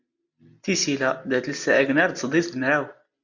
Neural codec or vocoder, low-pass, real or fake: none; 7.2 kHz; real